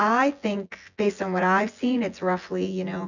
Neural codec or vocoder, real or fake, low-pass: vocoder, 24 kHz, 100 mel bands, Vocos; fake; 7.2 kHz